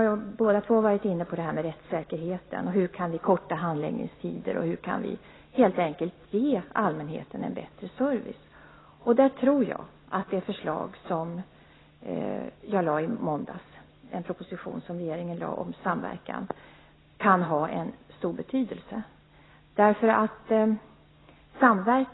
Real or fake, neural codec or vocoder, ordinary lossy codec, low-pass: real; none; AAC, 16 kbps; 7.2 kHz